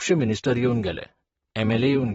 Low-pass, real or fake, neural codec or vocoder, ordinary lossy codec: 19.8 kHz; fake; vocoder, 44.1 kHz, 128 mel bands, Pupu-Vocoder; AAC, 24 kbps